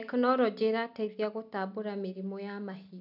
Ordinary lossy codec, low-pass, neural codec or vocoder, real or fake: none; 5.4 kHz; none; real